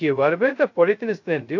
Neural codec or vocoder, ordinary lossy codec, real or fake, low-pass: codec, 16 kHz, 0.2 kbps, FocalCodec; AAC, 48 kbps; fake; 7.2 kHz